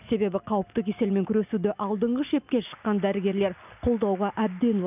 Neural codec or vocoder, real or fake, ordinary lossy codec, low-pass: none; real; none; 3.6 kHz